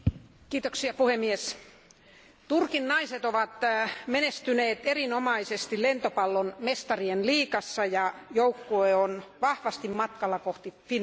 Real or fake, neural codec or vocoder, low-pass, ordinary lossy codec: real; none; none; none